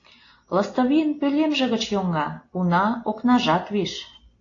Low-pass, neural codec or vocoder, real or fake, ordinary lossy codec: 7.2 kHz; none; real; AAC, 32 kbps